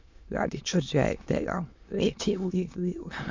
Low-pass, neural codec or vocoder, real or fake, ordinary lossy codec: 7.2 kHz; autoencoder, 22.05 kHz, a latent of 192 numbers a frame, VITS, trained on many speakers; fake; MP3, 64 kbps